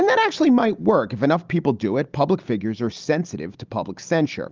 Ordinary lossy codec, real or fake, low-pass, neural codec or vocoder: Opus, 24 kbps; real; 7.2 kHz; none